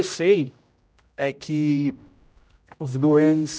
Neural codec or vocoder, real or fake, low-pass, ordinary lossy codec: codec, 16 kHz, 0.5 kbps, X-Codec, HuBERT features, trained on general audio; fake; none; none